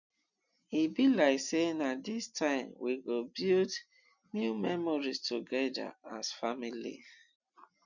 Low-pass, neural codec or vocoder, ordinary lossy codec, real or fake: 7.2 kHz; none; none; real